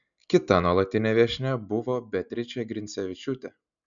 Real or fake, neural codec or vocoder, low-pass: real; none; 7.2 kHz